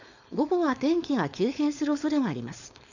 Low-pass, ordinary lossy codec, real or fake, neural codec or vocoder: 7.2 kHz; none; fake; codec, 16 kHz, 4.8 kbps, FACodec